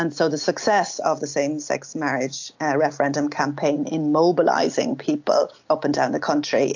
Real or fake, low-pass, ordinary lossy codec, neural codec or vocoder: real; 7.2 kHz; AAC, 48 kbps; none